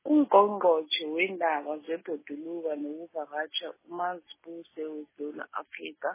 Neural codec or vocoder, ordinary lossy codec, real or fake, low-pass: none; MP3, 16 kbps; real; 3.6 kHz